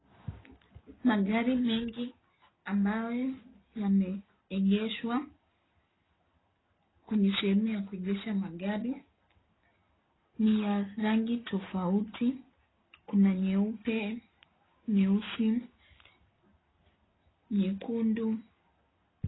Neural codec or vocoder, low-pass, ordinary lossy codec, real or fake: none; 7.2 kHz; AAC, 16 kbps; real